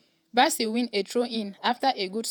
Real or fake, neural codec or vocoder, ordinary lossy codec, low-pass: fake; vocoder, 48 kHz, 128 mel bands, Vocos; none; none